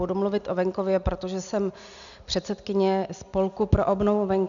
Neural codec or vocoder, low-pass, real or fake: none; 7.2 kHz; real